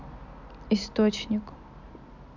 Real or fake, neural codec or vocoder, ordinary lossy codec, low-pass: real; none; none; 7.2 kHz